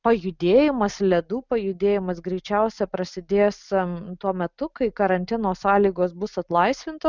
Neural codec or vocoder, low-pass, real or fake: none; 7.2 kHz; real